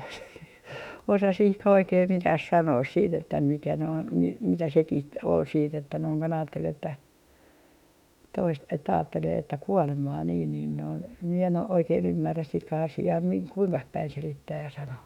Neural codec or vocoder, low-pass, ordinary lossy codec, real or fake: autoencoder, 48 kHz, 32 numbers a frame, DAC-VAE, trained on Japanese speech; 19.8 kHz; none; fake